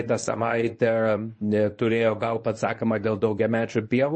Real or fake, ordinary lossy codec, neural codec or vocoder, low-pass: fake; MP3, 32 kbps; codec, 24 kHz, 0.9 kbps, WavTokenizer, medium speech release version 1; 9.9 kHz